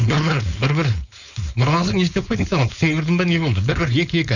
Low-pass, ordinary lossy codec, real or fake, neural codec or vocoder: 7.2 kHz; none; fake; codec, 16 kHz, 4.8 kbps, FACodec